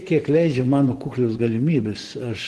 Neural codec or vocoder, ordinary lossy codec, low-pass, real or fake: vocoder, 24 kHz, 100 mel bands, Vocos; Opus, 16 kbps; 10.8 kHz; fake